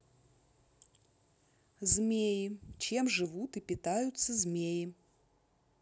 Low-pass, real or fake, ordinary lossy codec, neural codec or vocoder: none; real; none; none